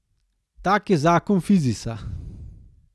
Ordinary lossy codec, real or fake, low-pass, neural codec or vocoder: none; real; none; none